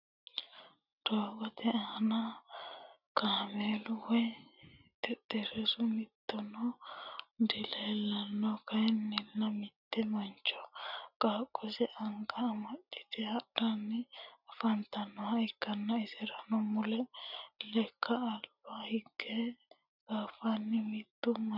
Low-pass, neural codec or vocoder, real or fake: 5.4 kHz; none; real